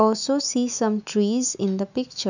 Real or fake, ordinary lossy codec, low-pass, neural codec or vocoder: fake; none; 7.2 kHz; autoencoder, 48 kHz, 128 numbers a frame, DAC-VAE, trained on Japanese speech